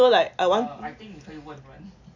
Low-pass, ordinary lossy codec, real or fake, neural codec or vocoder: 7.2 kHz; none; real; none